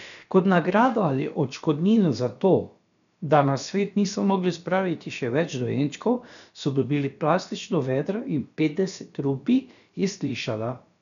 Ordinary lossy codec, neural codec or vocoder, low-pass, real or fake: none; codec, 16 kHz, about 1 kbps, DyCAST, with the encoder's durations; 7.2 kHz; fake